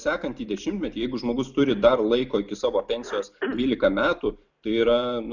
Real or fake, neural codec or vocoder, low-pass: real; none; 7.2 kHz